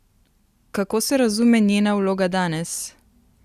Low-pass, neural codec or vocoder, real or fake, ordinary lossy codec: 14.4 kHz; none; real; Opus, 64 kbps